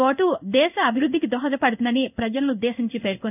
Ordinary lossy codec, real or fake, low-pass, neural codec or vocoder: none; fake; 3.6 kHz; codec, 16 kHz in and 24 kHz out, 1 kbps, XY-Tokenizer